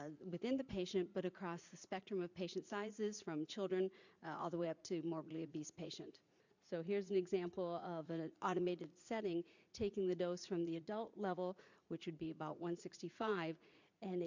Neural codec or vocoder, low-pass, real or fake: vocoder, 44.1 kHz, 80 mel bands, Vocos; 7.2 kHz; fake